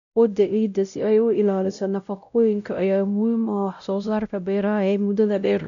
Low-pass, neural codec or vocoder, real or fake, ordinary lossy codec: 7.2 kHz; codec, 16 kHz, 0.5 kbps, X-Codec, WavLM features, trained on Multilingual LibriSpeech; fake; none